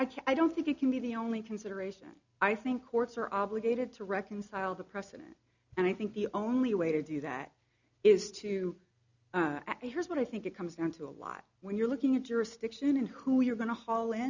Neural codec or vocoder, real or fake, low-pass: none; real; 7.2 kHz